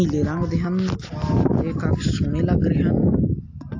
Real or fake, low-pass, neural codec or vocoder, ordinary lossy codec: real; 7.2 kHz; none; AAC, 48 kbps